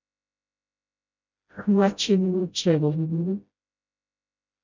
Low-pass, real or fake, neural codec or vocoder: 7.2 kHz; fake; codec, 16 kHz, 0.5 kbps, FreqCodec, smaller model